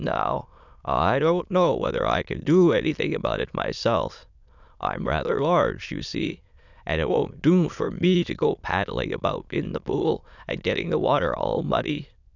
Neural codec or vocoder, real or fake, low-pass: autoencoder, 22.05 kHz, a latent of 192 numbers a frame, VITS, trained on many speakers; fake; 7.2 kHz